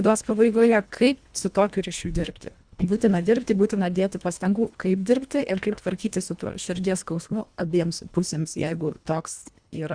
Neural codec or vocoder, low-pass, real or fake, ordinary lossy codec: codec, 24 kHz, 1.5 kbps, HILCodec; 9.9 kHz; fake; Opus, 64 kbps